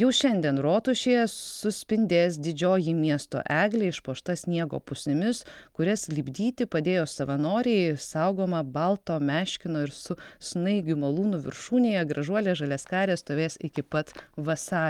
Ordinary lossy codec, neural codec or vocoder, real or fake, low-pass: Opus, 32 kbps; none; real; 19.8 kHz